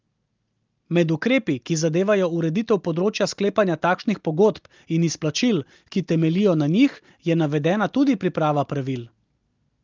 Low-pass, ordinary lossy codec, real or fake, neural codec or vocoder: 7.2 kHz; Opus, 24 kbps; real; none